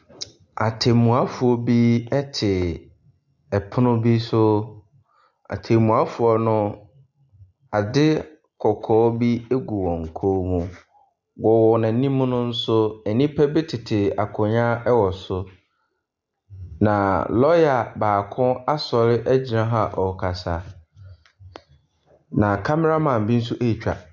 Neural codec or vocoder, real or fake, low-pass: none; real; 7.2 kHz